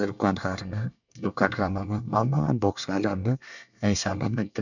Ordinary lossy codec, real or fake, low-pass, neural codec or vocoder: none; fake; 7.2 kHz; codec, 24 kHz, 1 kbps, SNAC